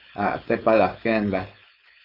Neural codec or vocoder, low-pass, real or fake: codec, 16 kHz, 4.8 kbps, FACodec; 5.4 kHz; fake